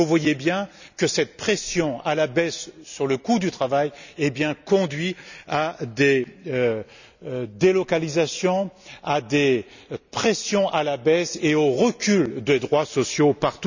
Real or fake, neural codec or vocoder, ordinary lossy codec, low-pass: real; none; none; 7.2 kHz